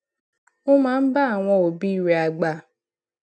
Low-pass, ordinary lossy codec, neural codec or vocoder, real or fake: none; none; none; real